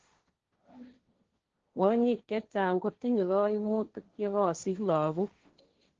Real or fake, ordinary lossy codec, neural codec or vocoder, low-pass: fake; Opus, 16 kbps; codec, 16 kHz, 1.1 kbps, Voila-Tokenizer; 7.2 kHz